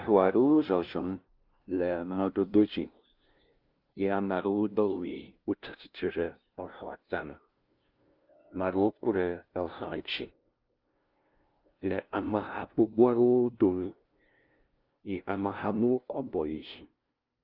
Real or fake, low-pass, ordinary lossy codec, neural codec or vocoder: fake; 5.4 kHz; Opus, 24 kbps; codec, 16 kHz, 0.5 kbps, FunCodec, trained on LibriTTS, 25 frames a second